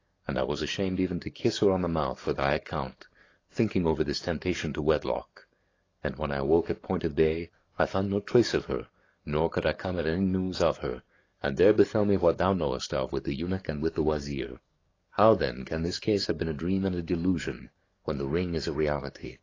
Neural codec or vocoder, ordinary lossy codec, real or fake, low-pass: codec, 44.1 kHz, 7.8 kbps, DAC; AAC, 32 kbps; fake; 7.2 kHz